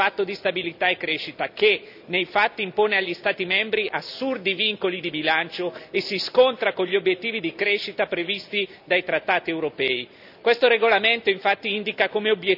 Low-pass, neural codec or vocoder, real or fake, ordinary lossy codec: 5.4 kHz; none; real; none